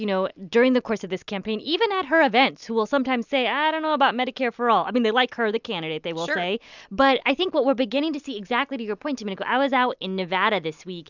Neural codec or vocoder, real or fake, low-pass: none; real; 7.2 kHz